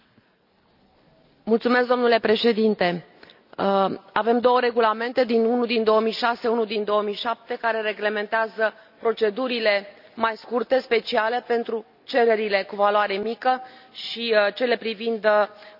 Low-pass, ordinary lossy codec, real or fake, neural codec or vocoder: 5.4 kHz; none; real; none